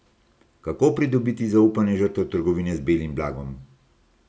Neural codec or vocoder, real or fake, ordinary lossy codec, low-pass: none; real; none; none